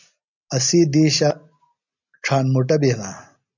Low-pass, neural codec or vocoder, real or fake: 7.2 kHz; none; real